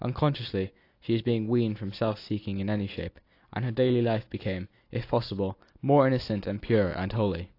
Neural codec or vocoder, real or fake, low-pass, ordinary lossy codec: none; real; 5.4 kHz; AAC, 32 kbps